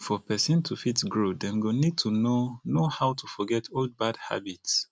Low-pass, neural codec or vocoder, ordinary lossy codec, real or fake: none; none; none; real